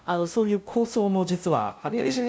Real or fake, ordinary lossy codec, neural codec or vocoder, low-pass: fake; none; codec, 16 kHz, 0.5 kbps, FunCodec, trained on LibriTTS, 25 frames a second; none